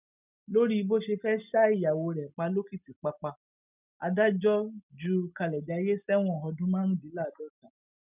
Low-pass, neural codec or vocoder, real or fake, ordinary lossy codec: 3.6 kHz; none; real; none